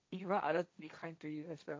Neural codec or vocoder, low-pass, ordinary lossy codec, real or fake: codec, 16 kHz, 1.1 kbps, Voila-Tokenizer; none; none; fake